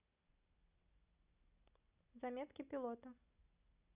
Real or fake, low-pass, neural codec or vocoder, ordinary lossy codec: real; 3.6 kHz; none; none